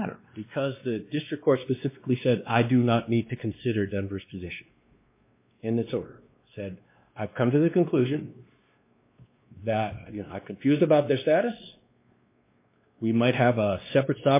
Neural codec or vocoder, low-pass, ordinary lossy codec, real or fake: codec, 16 kHz, 2 kbps, X-Codec, WavLM features, trained on Multilingual LibriSpeech; 3.6 kHz; MP3, 24 kbps; fake